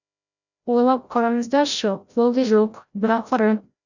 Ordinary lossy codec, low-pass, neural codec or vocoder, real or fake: none; 7.2 kHz; codec, 16 kHz, 0.5 kbps, FreqCodec, larger model; fake